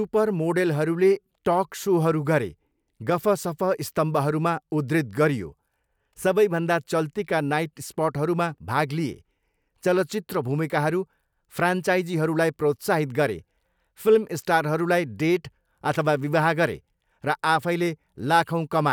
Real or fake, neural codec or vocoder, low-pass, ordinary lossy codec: real; none; none; none